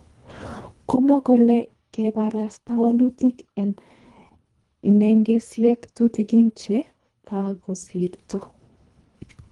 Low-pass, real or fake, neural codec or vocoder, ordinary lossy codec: 10.8 kHz; fake; codec, 24 kHz, 1.5 kbps, HILCodec; Opus, 24 kbps